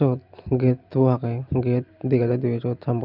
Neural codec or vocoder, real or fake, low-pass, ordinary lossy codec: none; real; 5.4 kHz; Opus, 24 kbps